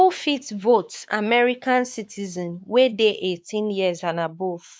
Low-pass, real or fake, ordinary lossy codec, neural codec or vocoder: none; fake; none; codec, 16 kHz, 4 kbps, X-Codec, WavLM features, trained on Multilingual LibriSpeech